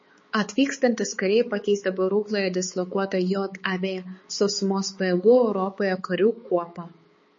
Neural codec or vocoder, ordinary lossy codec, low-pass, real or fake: codec, 16 kHz, 4 kbps, X-Codec, HuBERT features, trained on balanced general audio; MP3, 32 kbps; 7.2 kHz; fake